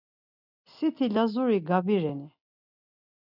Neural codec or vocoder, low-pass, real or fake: none; 5.4 kHz; real